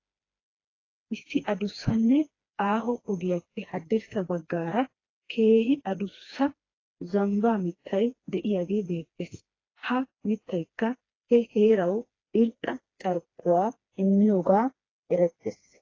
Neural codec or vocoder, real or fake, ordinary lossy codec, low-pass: codec, 16 kHz, 2 kbps, FreqCodec, smaller model; fake; AAC, 32 kbps; 7.2 kHz